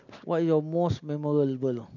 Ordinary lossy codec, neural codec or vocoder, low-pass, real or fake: none; none; 7.2 kHz; real